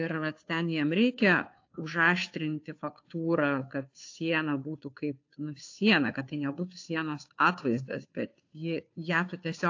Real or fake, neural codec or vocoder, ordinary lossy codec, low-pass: fake; codec, 16 kHz, 2 kbps, FunCodec, trained on Chinese and English, 25 frames a second; AAC, 48 kbps; 7.2 kHz